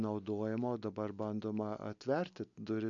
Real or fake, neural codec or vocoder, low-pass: real; none; 7.2 kHz